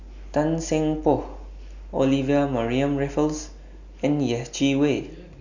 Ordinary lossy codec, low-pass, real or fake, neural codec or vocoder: none; 7.2 kHz; real; none